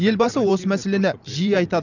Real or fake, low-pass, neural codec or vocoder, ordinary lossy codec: fake; 7.2 kHz; vocoder, 44.1 kHz, 128 mel bands every 512 samples, BigVGAN v2; none